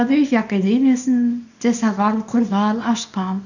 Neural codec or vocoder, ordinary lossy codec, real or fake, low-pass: codec, 24 kHz, 0.9 kbps, WavTokenizer, small release; none; fake; 7.2 kHz